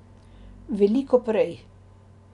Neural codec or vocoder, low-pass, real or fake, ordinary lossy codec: none; 10.8 kHz; real; none